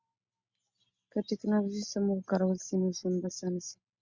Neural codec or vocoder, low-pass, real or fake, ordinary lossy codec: none; 7.2 kHz; real; Opus, 64 kbps